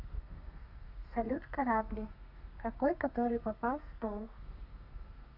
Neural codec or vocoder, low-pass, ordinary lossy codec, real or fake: codec, 32 kHz, 1.9 kbps, SNAC; 5.4 kHz; none; fake